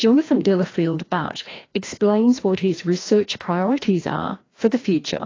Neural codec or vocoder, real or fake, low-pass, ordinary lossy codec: codec, 16 kHz, 1 kbps, FreqCodec, larger model; fake; 7.2 kHz; AAC, 32 kbps